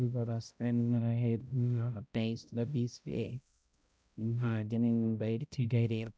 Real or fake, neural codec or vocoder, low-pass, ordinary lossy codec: fake; codec, 16 kHz, 0.5 kbps, X-Codec, HuBERT features, trained on balanced general audio; none; none